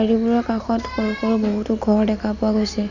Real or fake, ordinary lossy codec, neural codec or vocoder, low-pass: real; none; none; 7.2 kHz